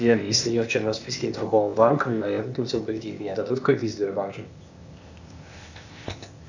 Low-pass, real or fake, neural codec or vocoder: 7.2 kHz; fake; codec, 16 kHz, 0.8 kbps, ZipCodec